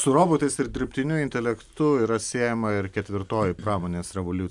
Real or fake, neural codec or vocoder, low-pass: real; none; 10.8 kHz